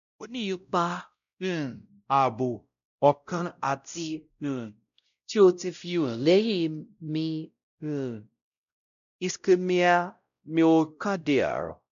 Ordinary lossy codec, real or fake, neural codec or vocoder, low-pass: none; fake; codec, 16 kHz, 0.5 kbps, X-Codec, WavLM features, trained on Multilingual LibriSpeech; 7.2 kHz